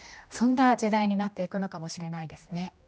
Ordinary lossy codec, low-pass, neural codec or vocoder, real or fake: none; none; codec, 16 kHz, 1 kbps, X-Codec, HuBERT features, trained on general audio; fake